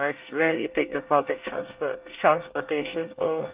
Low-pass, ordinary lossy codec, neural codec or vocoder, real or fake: 3.6 kHz; Opus, 24 kbps; codec, 24 kHz, 1 kbps, SNAC; fake